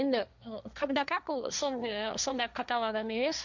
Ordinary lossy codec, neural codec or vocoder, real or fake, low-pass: none; codec, 16 kHz, 1.1 kbps, Voila-Tokenizer; fake; 7.2 kHz